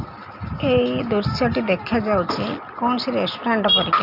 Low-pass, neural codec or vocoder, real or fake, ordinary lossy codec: 5.4 kHz; none; real; none